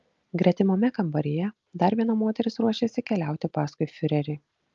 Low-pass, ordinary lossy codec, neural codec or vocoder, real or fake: 7.2 kHz; Opus, 32 kbps; none; real